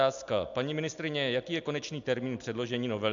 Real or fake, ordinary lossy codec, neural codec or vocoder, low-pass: real; MP3, 64 kbps; none; 7.2 kHz